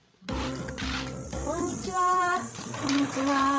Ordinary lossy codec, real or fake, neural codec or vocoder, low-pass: none; fake; codec, 16 kHz, 16 kbps, FreqCodec, larger model; none